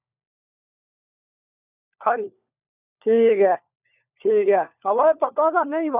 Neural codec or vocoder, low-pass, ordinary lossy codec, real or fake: codec, 16 kHz, 4 kbps, FunCodec, trained on LibriTTS, 50 frames a second; 3.6 kHz; none; fake